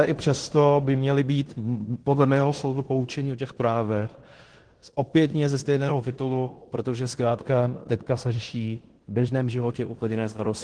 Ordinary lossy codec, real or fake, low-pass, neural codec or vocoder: Opus, 16 kbps; fake; 9.9 kHz; codec, 16 kHz in and 24 kHz out, 0.9 kbps, LongCat-Audio-Codec, fine tuned four codebook decoder